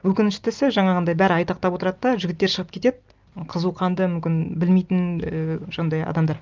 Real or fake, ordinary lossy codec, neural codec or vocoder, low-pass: real; Opus, 24 kbps; none; 7.2 kHz